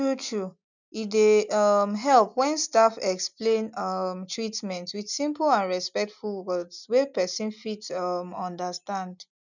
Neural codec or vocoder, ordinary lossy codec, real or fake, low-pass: none; none; real; 7.2 kHz